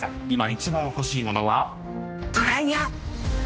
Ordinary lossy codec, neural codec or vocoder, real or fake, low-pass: none; codec, 16 kHz, 1 kbps, X-Codec, HuBERT features, trained on general audio; fake; none